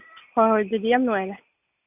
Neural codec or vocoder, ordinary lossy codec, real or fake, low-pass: none; none; real; 3.6 kHz